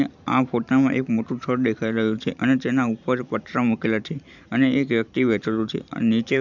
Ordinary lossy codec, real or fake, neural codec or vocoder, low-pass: none; fake; vocoder, 44.1 kHz, 128 mel bands every 512 samples, BigVGAN v2; 7.2 kHz